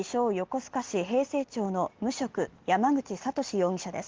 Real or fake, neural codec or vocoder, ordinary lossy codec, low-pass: real; none; Opus, 16 kbps; 7.2 kHz